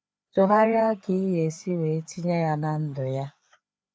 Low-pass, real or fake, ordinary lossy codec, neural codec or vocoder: none; fake; none; codec, 16 kHz, 4 kbps, FreqCodec, larger model